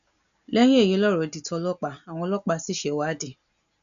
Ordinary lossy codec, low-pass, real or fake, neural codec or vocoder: none; 7.2 kHz; real; none